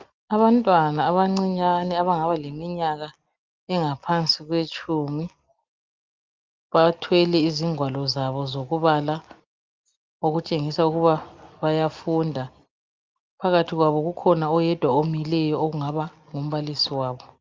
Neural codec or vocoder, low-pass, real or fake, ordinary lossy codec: none; 7.2 kHz; real; Opus, 24 kbps